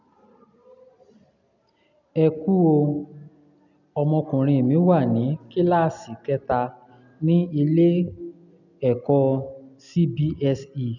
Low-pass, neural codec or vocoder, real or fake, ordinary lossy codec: 7.2 kHz; none; real; none